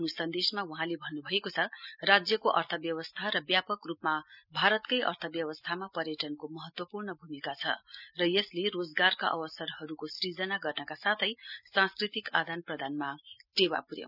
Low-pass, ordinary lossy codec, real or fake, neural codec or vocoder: 5.4 kHz; none; real; none